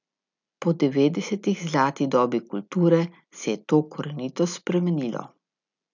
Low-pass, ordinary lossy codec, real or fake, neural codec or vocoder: 7.2 kHz; none; real; none